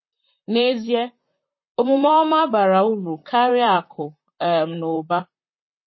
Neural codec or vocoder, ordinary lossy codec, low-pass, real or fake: vocoder, 44.1 kHz, 128 mel bands, Pupu-Vocoder; MP3, 24 kbps; 7.2 kHz; fake